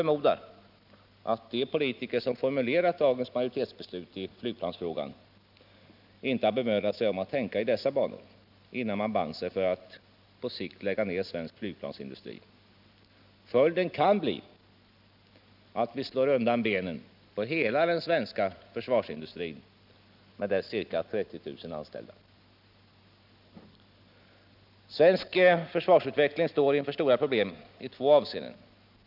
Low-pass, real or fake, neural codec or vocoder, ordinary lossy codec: 5.4 kHz; real; none; none